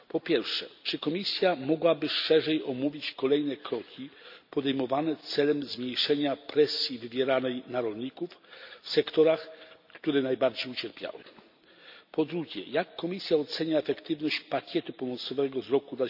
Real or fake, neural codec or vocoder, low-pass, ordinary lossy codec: real; none; 5.4 kHz; none